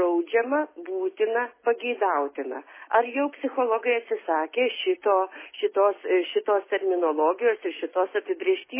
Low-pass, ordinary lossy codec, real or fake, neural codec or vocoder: 3.6 kHz; MP3, 16 kbps; real; none